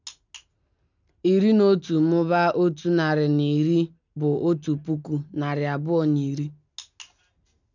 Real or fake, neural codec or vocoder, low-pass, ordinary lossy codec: real; none; 7.2 kHz; none